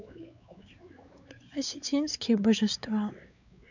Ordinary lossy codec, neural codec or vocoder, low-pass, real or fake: none; codec, 16 kHz, 4 kbps, X-Codec, WavLM features, trained on Multilingual LibriSpeech; 7.2 kHz; fake